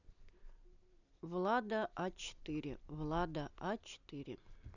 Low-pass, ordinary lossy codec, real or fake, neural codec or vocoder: 7.2 kHz; none; real; none